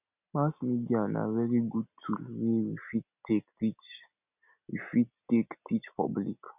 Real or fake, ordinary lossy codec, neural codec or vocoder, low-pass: real; none; none; 3.6 kHz